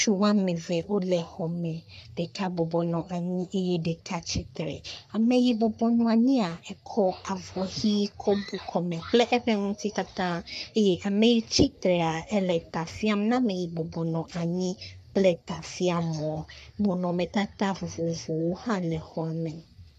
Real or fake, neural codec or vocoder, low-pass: fake; codec, 44.1 kHz, 3.4 kbps, Pupu-Codec; 14.4 kHz